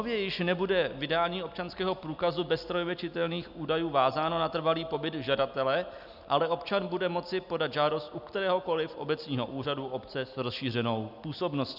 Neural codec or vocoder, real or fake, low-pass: none; real; 5.4 kHz